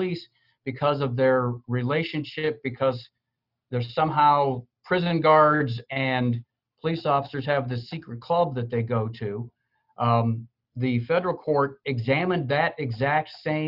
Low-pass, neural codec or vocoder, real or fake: 5.4 kHz; none; real